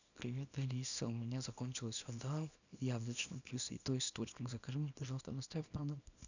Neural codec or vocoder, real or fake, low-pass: codec, 24 kHz, 0.9 kbps, WavTokenizer, small release; fake; 7.2 kHz